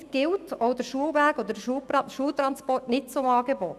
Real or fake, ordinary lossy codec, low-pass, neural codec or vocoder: fake; Opus, 64 kbps; 14.4 kHz; autoencoder, 48 kHz, 128 numbers a frame, DAC-VAE, trained on Japanese speech